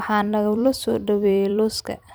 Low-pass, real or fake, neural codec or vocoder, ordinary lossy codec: none; fake; vocoder, 44.1 kHz, 128 mel bands every 256 samples, BigVGAN v2; none